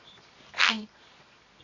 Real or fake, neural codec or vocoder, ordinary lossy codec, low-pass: fake; codec, 24 kHz, 0.9 kbps, WavTokenizer, medium music audio release; none; 7.2 kHz